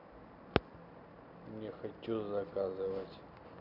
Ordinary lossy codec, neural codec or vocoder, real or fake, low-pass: none; none; real; 5.4 kHz